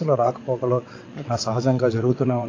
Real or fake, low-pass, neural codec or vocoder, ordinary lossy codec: fake; 7.2 kHz; vocoder, 22.05 kHz, 80 mel bands, WaveNeXt; AAC, 32 kbps